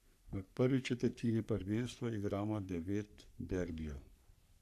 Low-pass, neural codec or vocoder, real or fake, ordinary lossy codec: 14.4 kHz; codec, 44.1 kHz, 3.4 kbps, Pupu-Codec; fake; none